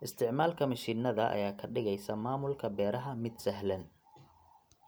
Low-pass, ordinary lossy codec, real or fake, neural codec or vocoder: none; none; real; none